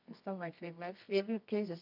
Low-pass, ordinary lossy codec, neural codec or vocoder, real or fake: 5.4 kHz; none; codec, 24 kHz, 0.9 kbps, WavTokenizer, medium music audio release; fake